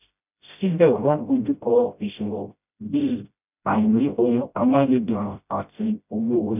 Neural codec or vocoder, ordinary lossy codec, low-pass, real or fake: codec, 16 kHz, 0.5 kbps, FreqCodec, smaller model; none; 3.6 kHz; fake